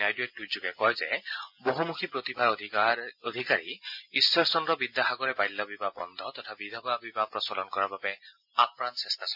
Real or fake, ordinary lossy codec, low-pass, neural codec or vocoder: real; MP3, 48 kbps; 5.4 kHz; none